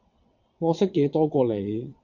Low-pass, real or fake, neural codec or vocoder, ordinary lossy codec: 7.2 kHz; fake; vocoder, 22.05 kHz, 80 mel bands, WaveNeXt; MP3, 48 kbps